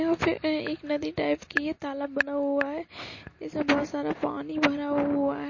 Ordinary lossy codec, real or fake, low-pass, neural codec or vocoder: MP3, 32 kbps; real; 7.2 kHz; none